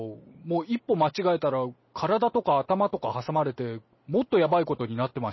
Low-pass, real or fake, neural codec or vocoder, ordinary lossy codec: 7.2 kHz; real; none; MP3, 24 kbps